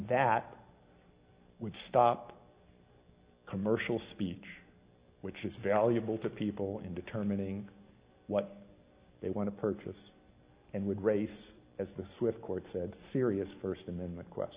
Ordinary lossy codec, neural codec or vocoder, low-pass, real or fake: Opus, 64 kbps; none; 3.6 kHz; real